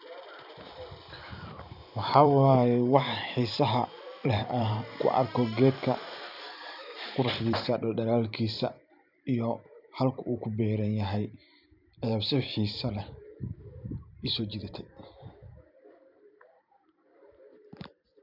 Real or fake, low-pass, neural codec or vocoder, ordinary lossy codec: fake; 5.4 kHz; vocoder, 44.1 kHz, 128 mel bands every 512 samples, BigVGAN v2; none